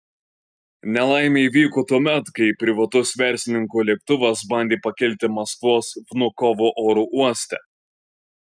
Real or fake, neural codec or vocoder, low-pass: real; none; 9.9 kHz